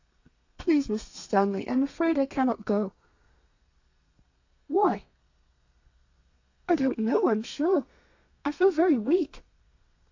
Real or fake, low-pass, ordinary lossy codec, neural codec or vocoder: fake; 7.2 kHz; AAC, 48 kbps; codec, 32 kHz, 1.9 kbps, SNAC